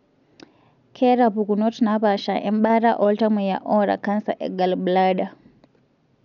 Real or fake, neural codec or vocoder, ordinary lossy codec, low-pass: real; none; MP3, 96 kbps; 7.2 kHz